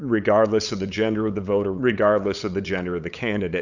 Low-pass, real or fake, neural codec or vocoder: 7.2 kHz; fake; codec, 16 kHz, 8 kbps, FunCodec, trained on LibriTTS, 25 frames a second